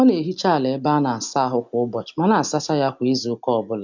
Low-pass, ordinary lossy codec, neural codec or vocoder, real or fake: 7.2 kHz; MP3, 64 kbps; none; real